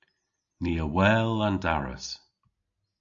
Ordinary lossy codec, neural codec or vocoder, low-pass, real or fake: AAC, 64 kbps; none; 7.2 kHz; real